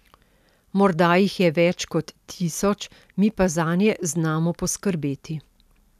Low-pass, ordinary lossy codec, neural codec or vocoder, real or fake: 14.4 kHz; none; none; real